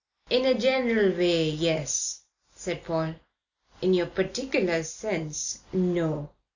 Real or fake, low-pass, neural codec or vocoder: real; 7.2 kHz; none